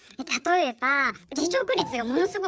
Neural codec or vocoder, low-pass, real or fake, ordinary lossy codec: codec, 16 kHz, 4 kbps, FreqCodec, larger model; none; fake; none